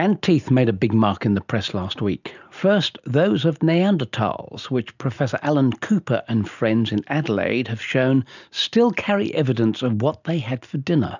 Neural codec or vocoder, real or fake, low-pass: none; real; 7.2 kHz